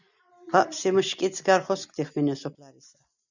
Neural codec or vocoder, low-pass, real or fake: none; 7.2 kHz; real